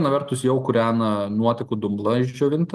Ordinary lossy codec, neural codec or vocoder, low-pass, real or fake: Opus, 24 kbps; none; 14.4 kHz; real